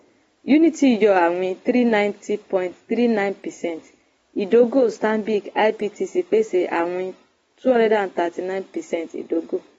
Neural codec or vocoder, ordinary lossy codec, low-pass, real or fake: none; AAC, 24 kbps; 19.8 kHz; real